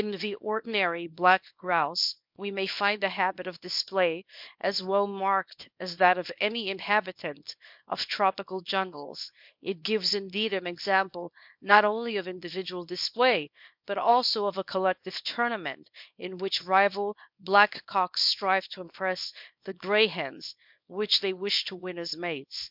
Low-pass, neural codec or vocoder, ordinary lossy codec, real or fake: 5.4 kHz; codec, 24 kHz, 0.9 kbps, WavTokenizer, small release; MP3, 48 kbps; fake